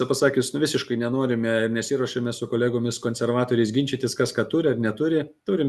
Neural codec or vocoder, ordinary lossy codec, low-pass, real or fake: none; Opus, 64 kbps; 14.4 kHz; real